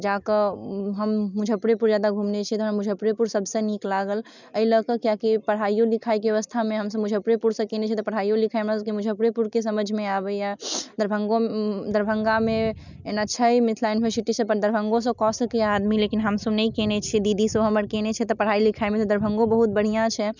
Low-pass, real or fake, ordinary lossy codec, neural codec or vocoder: 7.2 kHz; real; none; none